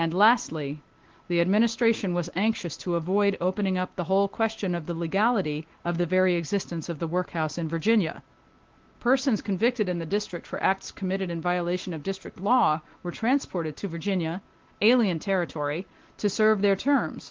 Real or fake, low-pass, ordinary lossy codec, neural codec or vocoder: real; 7.2 kHz; Opus, 16 kbps; none